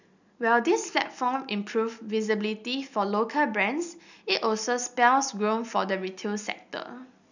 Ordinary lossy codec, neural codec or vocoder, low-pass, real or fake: none; none; 7.2 kHz; real